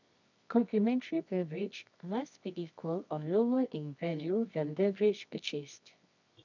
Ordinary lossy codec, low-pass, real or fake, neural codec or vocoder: none; 7.2 kHz; fake; codec, 24 kHz, 0.9 kbps, WavTokenizer, medium music audio release